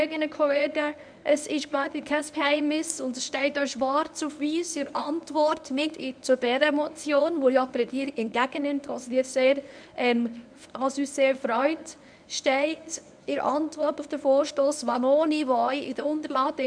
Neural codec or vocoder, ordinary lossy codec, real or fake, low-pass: codec, 24 kHz, 0.9 kbps, WavTokenizer, medium speech release version 1; none; fake; 9.9 kHz